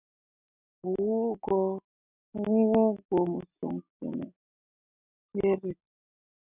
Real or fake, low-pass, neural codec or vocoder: real; 3.6 kHz; none